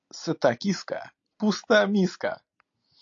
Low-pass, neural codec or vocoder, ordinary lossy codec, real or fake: 7.2 kHz; none; AAC, 48 kbps; real